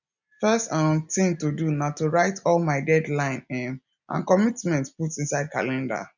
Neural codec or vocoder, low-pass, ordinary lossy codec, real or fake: none; 7.2 kHz; none; real